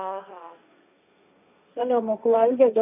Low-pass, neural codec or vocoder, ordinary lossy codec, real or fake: 3.6 kHz; codec, 16 kHz, 1.1 kbps, Voila-Tokenizer; none; fake